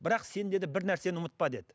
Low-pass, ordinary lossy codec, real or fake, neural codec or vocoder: none; none; real; none